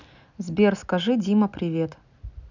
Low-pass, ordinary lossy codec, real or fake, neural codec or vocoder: 7.2 kHz; none; real; none